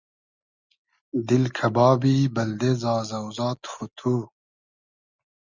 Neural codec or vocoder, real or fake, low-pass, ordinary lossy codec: none; real; 7.2 kHz; Opus, 64 kbps